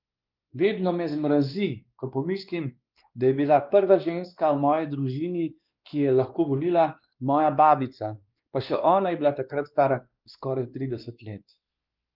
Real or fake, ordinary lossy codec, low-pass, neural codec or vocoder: fake; Opus, 32 kbps; 5.4 kHz; codec, 16 kHz, 2 kbps, X-Codec, WavLM features, trained on Multilingual LibriSpeech